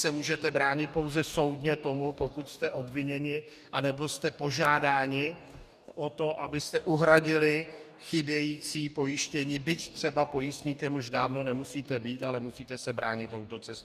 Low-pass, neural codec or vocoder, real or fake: 14.4 kHz; codec, 44.1 kHz, 2.6 kbps, DAC; fake